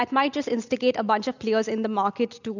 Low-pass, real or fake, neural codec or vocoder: 7.2 kHz; real; none